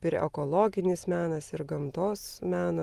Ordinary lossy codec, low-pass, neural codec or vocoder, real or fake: Opus, 24 kbps; 14.4 kHz; none; real